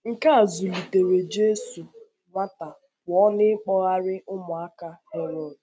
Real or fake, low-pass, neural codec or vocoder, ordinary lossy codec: real; none; none; none